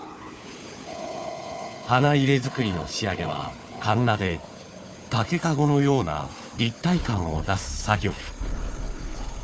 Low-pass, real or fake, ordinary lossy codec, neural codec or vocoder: none; fake; none; codec, 16 kHz, 4 kbps, FunCodec, trained on Chinese and English, 50 frames a second